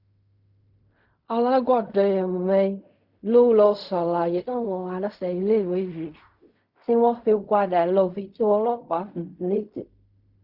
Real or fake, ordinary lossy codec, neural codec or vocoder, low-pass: fake; Opus, 64 kbps; codec, 16 kHz in and 24 kHz out, 0.4 kbps, LongCat-Audio-Codec, fine tuned four codebook decoder; 5.4 kHz